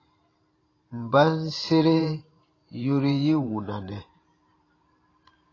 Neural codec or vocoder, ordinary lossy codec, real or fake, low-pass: vocoder, 44.1 kHz, 128 mel bands every 512 samples, BigVGAN v2; AAC, 32 kbps; fake; 7.2 kHz